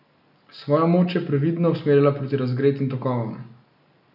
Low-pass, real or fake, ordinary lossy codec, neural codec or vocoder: 5.4 kHz; real; none; none